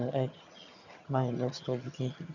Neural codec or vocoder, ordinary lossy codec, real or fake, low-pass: vocoder, 22.05 kHz, 80 mel bands, HiFi-GAN; none; fake; 7.2 kHz